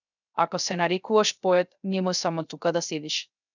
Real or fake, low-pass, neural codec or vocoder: fake; 7.2 kHz; codec, 16 kHz, 0.3 kbps, FocalCodec